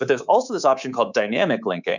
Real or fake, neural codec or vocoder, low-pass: fake; codec, 24 kHz, 3.1 kbps, DualCodec; 7.2 kHz